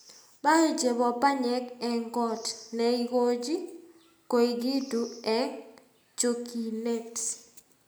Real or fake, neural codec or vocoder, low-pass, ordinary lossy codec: real; none; none; none